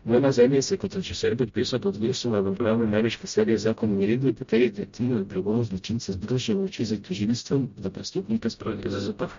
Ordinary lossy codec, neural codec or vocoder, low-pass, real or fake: MP3, 48 kbps; codec, 16 kHz, 0.5 kbps, FreqCodec, smaller model; 7.2 kHz; fake